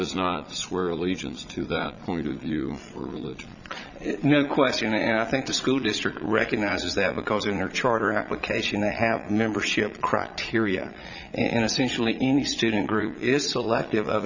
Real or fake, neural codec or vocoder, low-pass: fake; vocoder, 22.05 kHz, 80 mel bands, Vocos; 7.2 kHz